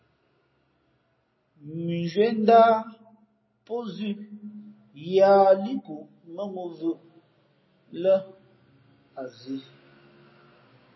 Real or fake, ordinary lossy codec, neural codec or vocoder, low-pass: real; MP3, 24 kbps; none; 7.2 kHz